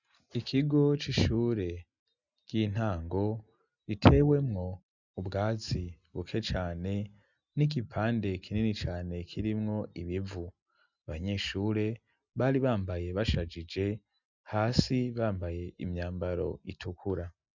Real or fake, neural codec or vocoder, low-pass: real; none; 7.2 kHz